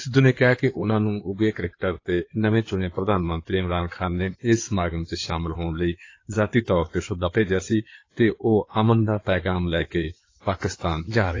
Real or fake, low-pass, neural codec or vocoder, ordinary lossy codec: fake; 7.2 kHz; codec, 16 kHz in and 24 kHz out, 2.2 kbps, FireRedTTS-2 codec; AAC, 32 kbps